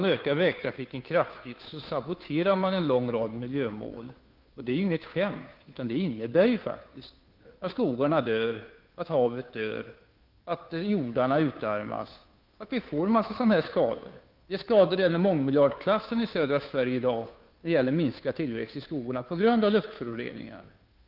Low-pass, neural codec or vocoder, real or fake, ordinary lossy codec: 5.4 kHz; codec, 16 kHz, 2 kbps, FunCodec, trained on Chinese and English, 25 frames a second; fake; Opus, 24 kbps